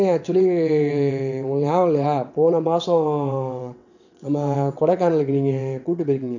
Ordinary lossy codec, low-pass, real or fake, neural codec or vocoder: none; 7.2 kHz; fake; vocoder, 22.05 kHz, 80 mel bands, WaveNeXt